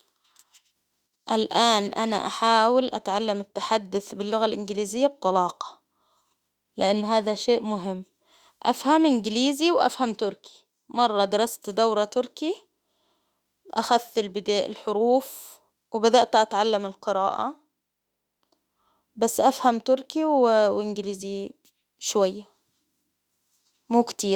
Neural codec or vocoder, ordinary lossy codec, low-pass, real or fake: autoencoder, 48 kHz, 32 numbers a frame, DAC-VAE, trained on Japanese speech; Opus, 64 kbps; 19.8 kHz; fake